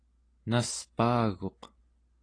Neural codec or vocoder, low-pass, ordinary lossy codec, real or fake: none; 9.9 kHz; AAC, 32 kbps; real